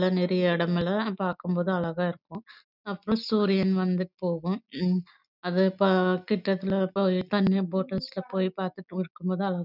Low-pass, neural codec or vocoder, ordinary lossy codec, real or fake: 5.4 kHz; none; none; real